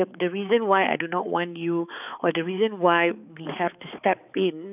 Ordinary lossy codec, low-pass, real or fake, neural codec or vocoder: none; 3.6 kHz; fake; codec, 16 kHz, 4 kbps, X-Codec, HuBERT features, trained on balanced general audio